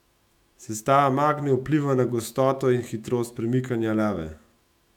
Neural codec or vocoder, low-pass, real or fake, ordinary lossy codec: autoencoder, 48 kHz, 128 numbers a frame, DAC-VAE, trained on Japanese speech; 19.8 kHz; fake; none